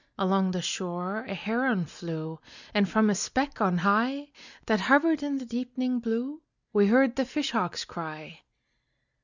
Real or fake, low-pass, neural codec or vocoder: real; 7.2 kHz; none